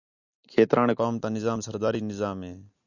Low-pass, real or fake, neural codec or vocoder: 7.2 kHz; real; none